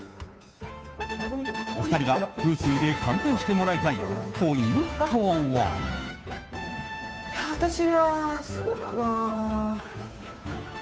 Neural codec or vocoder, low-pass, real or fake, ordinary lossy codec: codec, 16 kHz, 2 kbps, FunCodec, trained on Chinese and English, 25 frames a second; none; fake; none